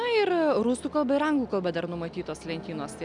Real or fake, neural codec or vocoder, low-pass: real; none; 10.8 kHz